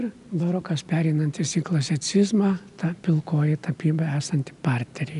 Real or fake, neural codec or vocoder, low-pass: real; none; 10.8 kHz